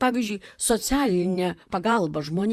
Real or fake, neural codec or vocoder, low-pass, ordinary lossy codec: fake; vocoder, 44.1 kHz, 128 mel bands, Pupu-Vocoder; 14.4 kHz; Opus, 64 kbps